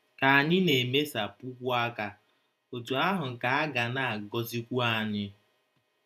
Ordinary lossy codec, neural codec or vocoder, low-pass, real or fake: none; vocoder, 48 kHz, 128 mel bands, Vocos; 14.4 kHz; fake